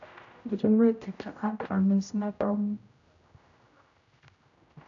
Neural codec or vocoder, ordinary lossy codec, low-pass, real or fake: codec, 16 kHz, 0.5 kbps, X-Codec, HuBERT features, trained on general audio; none; 7.2 kHz; fake